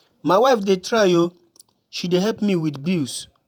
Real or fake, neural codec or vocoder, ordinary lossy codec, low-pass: fake; vocoder, 48 kHz, 128 mel bands, Vocos; none; none